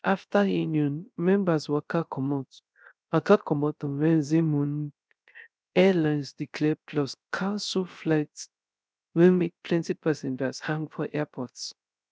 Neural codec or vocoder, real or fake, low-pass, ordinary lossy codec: codec, 16 kHz, 0.3 kbps, FocalCodec; fake; none; none